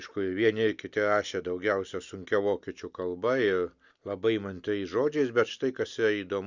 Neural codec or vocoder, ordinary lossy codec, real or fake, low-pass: none; Opus, 64 kbps; real; 7.2 kHz